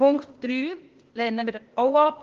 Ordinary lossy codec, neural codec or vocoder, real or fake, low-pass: Opus, 16 kbps; codec, 16 kHz, 0.8 kbps, ZipCodec; fake; 7.2 kHz